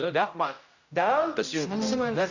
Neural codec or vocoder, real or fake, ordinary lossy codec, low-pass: codec, 16 kHz, 0.5 kbps, X-Codec, HuBERT features, trained on general audio; fake; none; 7.2 kHz